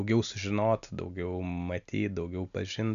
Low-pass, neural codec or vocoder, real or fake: 7.2 kHz; none; real